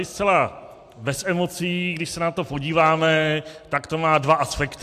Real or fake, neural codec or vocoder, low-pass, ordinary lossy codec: real; none; 14.4 kHz; AAC, 64 kbps